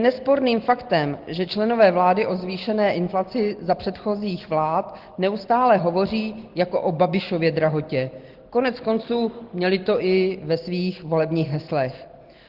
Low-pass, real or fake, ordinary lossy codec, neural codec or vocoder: 5.4 kHz; real; Opus, 16 kbps; none